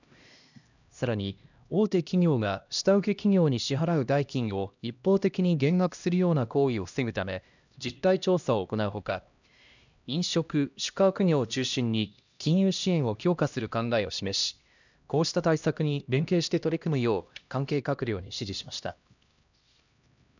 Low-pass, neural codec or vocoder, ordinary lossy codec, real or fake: 7.2 kHz; codec, 16 kHz, 1 kbps, X-Codec, HuBERT features, trained on LibriSpeech; none; fake